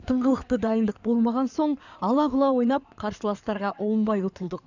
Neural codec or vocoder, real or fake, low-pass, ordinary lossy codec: codec, 16 kHz in and 24 kHz out, 2.2 kbps, FireRedTTS-2 codec; fake; 7.2 kHz; none